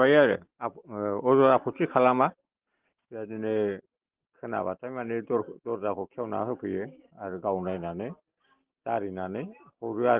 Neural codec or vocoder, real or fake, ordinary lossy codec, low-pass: none; real; Opus, 16 kbps; 3.6 kHz